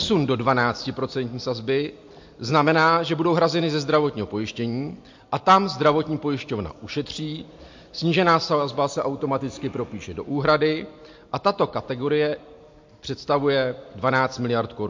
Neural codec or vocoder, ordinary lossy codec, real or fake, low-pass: none; MP3, 48 kbps; real; 7.2 kHz